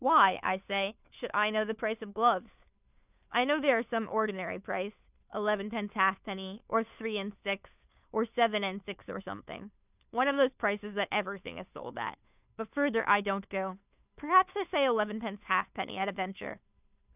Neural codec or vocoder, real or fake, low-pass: codec, 16 kHz, 2 kbps, FunCodec, trained on Chinese and English, 25 frames a second; fake; 3.6 kHz